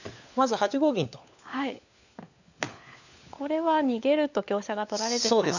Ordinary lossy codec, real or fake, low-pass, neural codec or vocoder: none; fake; 7.2 kHz; codec, 16 kHz, 4 kbps, FunCodec, trained on LibriTTS, 50 frames a second